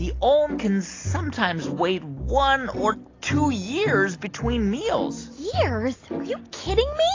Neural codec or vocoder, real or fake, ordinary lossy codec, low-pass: none; real; AAC, 32 kbps; 7.2 kHz